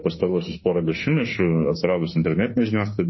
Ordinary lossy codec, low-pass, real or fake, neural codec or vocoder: MP3, 24 kbps; 7.2 kHz; fake; autoencoder, 48 kHz, 32 numbers a frame, DAC-VAE, trained on Japanese speech